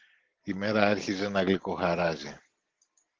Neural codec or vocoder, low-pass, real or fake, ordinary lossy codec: none; 7.2 kHz; real; Opus, 16 kbps